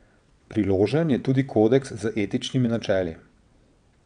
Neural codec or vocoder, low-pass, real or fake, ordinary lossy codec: vocoder, 22.05 kHz, 80 mel bands, WaveNeXt; 9.9 kHz; fake; none